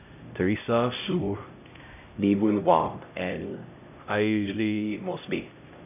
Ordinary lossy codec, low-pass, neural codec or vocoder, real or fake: none; 3.6 kHz; codec, 16 kHz, 0.5 kbps, X-Codec, HuBERT features, trained on LibriSpeech; fake